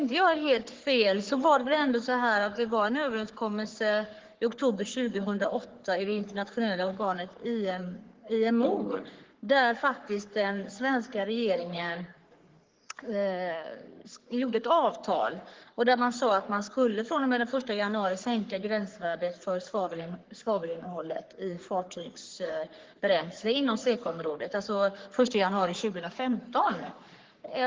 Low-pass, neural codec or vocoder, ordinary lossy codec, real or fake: 7.2 kHz; codec, 44.1 kHz, 3.4 kbps, Pupu-Codec; Opus, 16 kbps; fake